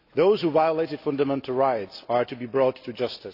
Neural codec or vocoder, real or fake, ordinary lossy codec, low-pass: none; real; AAC, 32 kbps; 5.4 kHz